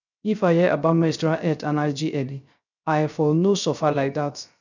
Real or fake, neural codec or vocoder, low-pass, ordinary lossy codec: fake; codec, 16 kHz, 0.3 kbps, FocalCodec; 7.2 kHz; none